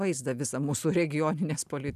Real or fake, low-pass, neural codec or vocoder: real; 14.4 kHz; none